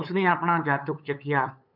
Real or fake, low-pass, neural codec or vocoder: fake; 5.4 kHz; codec, 16 kHz, 8 kbps, FunCodec, trained on LibriTTS, 25 frames a second